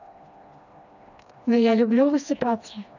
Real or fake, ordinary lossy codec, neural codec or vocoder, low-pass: fake; none; codec, 16 kHz, 2 kbps, FreqCodec, smaller model; 7.2 kHz